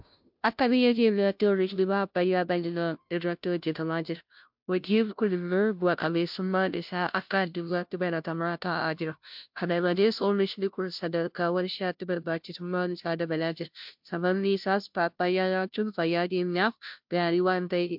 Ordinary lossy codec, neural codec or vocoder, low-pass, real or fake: MP3, 48 kbps; codec, 16 kHz, 0.5 kbps, FunCodec, trained on Chinese and English, 25 frames a second; 5.4 kHz; fake